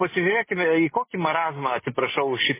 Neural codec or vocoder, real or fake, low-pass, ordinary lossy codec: none; real; 3.6 kHz; MP3, 16 kbps